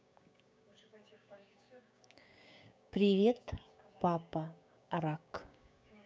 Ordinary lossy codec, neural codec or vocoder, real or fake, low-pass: none; codec, 16 kHz, 6 kbps, DAC; fake; none